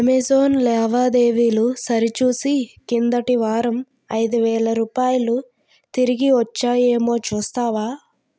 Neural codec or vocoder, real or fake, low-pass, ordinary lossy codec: none; real; none; none